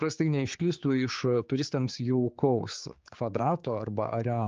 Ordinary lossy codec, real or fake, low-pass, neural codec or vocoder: Opus, 24 kbps; fake; 7.2 kHz; codec, 16 kHz, 4 kbps, X-Codec, HuBERT features, trained on general audio